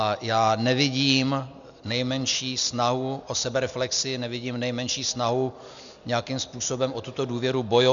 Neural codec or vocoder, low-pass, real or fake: none; 7.2 kHz; real